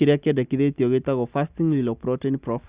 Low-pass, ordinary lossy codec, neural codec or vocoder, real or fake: 3.6 kHz; Opus, 64 kbps; none; real